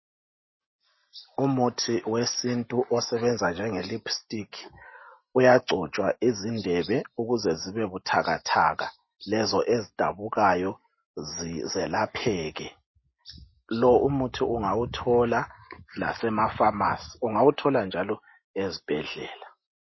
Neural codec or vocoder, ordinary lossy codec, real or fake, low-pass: none; MP3, 24 kbps; real; 7.2 kHz